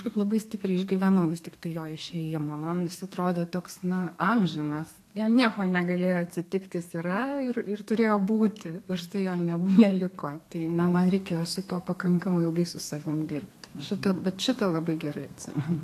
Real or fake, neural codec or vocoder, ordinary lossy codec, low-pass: fake; codec, 32 kHz, 1.9 kbps, SNAC; AAC, 64 kbps; 14.4 kHz